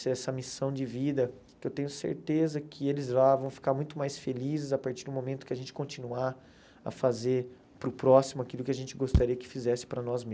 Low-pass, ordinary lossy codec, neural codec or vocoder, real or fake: none; none; none; real